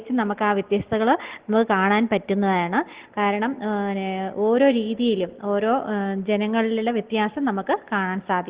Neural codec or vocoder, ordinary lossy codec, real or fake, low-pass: none; Opus, 16 kbps; real; 3.6 kHz